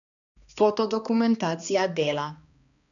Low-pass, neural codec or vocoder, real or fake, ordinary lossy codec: 7.2 kHz; codec, 16 kHz, 2 kbps, X-Codec, HuBERT features, trained on balanced general audio; fake; none